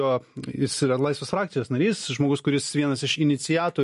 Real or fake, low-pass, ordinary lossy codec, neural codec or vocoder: real; 14.4 kHz; MP3, 48 kbps; none